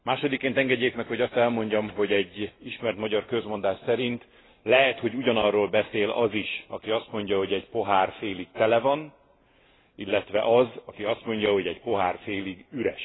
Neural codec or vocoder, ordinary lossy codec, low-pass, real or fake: none; AAC, 16 kbps; 7.2 kHz; real